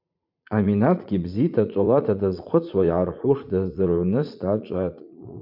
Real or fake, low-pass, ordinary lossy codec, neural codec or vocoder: fake; 5.4 kHz; MP3, 48 kbps; vocoder, 44.1 kHz, 80 mel bands, Vocos